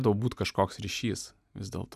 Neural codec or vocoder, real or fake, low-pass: none; real; 14.4 kHz